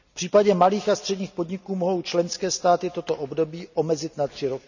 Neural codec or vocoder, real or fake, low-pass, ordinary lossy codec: none; real; 7.2 kHz; none